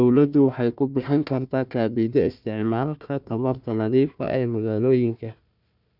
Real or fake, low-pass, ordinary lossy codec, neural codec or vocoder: fake; 5.4 kHz; none; codec, 16 kHz, 1 kbps, FunCodec, trained on Chinese and English, 50 frames a second